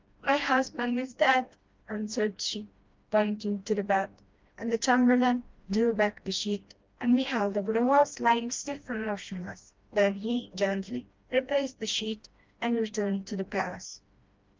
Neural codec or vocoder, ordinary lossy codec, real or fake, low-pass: codec, 16 kHz, 1 kbps, FreqCodec, smaller model; Opus, 32 kbps; fake; 7.2 kHz